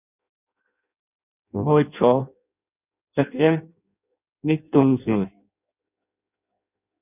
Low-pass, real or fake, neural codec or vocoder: 3.6 kHz; fake; codec, 16 kHz in and 24 kHz out, 0.6 kbps, FireRedTTS-2 codec